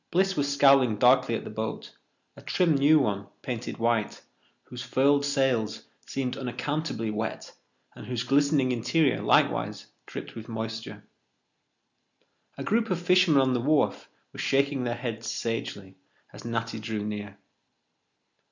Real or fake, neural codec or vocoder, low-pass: real; none; 7.2 kHz